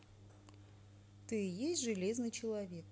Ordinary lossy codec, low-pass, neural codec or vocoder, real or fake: none; none; none; real